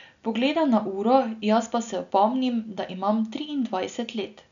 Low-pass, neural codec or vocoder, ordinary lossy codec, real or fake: 7.2 kHz; none; none; real